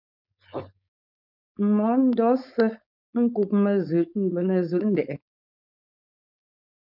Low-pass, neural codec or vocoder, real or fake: 5.4 kHz; codec, 16 kHz, 4.8 kbps, FACodec; fake